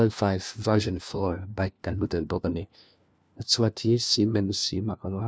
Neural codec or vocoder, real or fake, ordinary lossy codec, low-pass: codec, 16 kHz, 1 kbps, FunCodec, trained on LibriTTS, 50 frames a second; fake; none; none